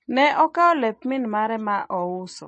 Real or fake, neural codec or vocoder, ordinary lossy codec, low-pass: real; none; MP3, 32 kbps; 10.8 kHz